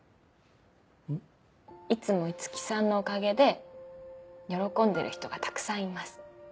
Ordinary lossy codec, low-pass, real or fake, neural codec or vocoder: none; none; real; none